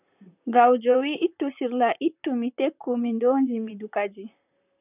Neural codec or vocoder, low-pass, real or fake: vocoder, 44.1 kHz, 128 mel bands, Pupu-Vocoder; 3.6 kHz; fake